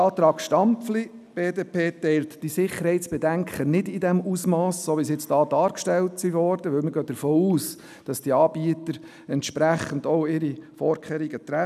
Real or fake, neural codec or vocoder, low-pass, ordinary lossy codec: real; none; 14.4 kHz; none